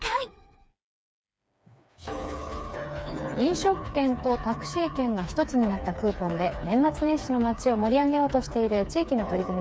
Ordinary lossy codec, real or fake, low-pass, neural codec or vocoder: none; fake; none; codec, 16 kHz, 4 kbps, FreqCodec, smaller model